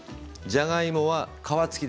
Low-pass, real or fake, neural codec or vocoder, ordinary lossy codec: none; real; none; none